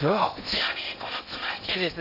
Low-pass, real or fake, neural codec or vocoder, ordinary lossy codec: 5.4 kHz; fake; codec, 16 kHz in and 24 kHz out, 0.6 kbps, FocalCodec, streaming, 4096 codes; none